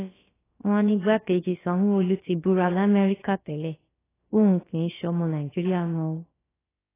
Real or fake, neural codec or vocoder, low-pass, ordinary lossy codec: fake; codec, 16 kHz, about 1 kbps, DyCAST, with the encoder's durations; 3.6 kHz; AAC, 16 kbps